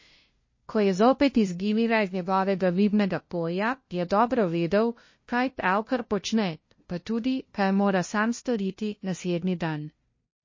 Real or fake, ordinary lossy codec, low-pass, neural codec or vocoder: fake; MP3, 32 kbps; 7.2 kHz; codec, 16 kHz, 0.5 kbps, FunCodec, trained on LibriTTS, 25 frames a second